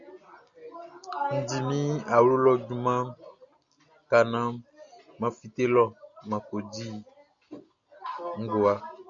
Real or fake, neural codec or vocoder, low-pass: real; none; 7.2 kHz